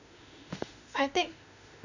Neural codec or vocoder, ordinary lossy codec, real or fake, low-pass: autoencoder, 48 kHz, 32 numbers a frame, DAC-VAE, trained on Japanese speech; none; fake; 7.2 kHz